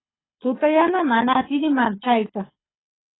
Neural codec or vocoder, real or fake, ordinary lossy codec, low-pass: codec, 24 kHz, 6 kbps, HILCodec; fake; AAC, 16 kbps; 7.2 kHz